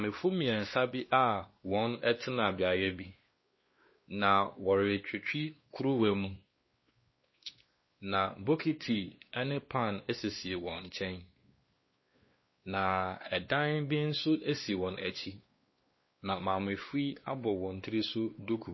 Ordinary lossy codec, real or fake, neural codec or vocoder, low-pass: MP3, 24 kbps; fake; codec, 16 kHz, 2 kbps, X-Codec, WavLM features, trained on Multilingual LibriSpeech; 7.2 kHz